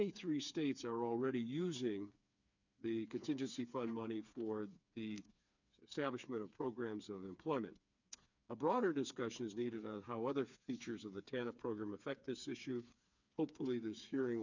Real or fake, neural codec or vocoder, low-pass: fake; codec, 16 kHz, 4 kbps, FreqCodec, smaller model; 7.2 kHz